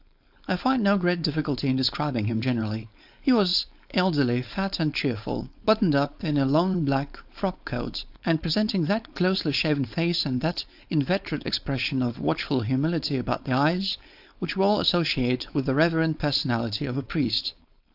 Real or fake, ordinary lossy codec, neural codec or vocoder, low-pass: fake; AAC, 48 kbps; codec, 16 kHz, 4.8 kbps, FACodec; 5.4 kHz